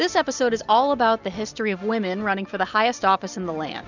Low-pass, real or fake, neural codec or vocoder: 7.2 kHz; real; none